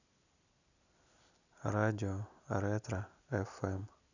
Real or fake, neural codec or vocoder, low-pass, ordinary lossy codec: real; none; 7.2 kHz; none